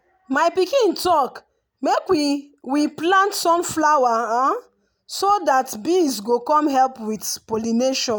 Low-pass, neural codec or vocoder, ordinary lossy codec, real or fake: none; none; none; real